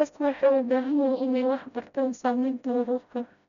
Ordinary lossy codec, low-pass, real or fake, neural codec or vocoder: none; 7.2 kHz; fake; codec, 16 kHz, 0.5 kbps, FreqCodec, smaller model